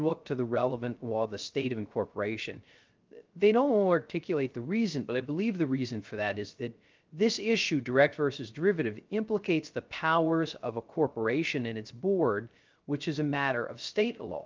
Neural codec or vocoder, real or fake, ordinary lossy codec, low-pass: codec, 16 kHz, 0.3 kbps, FocalCodec; fake; Opus, 32 kbps; 7.2 kHz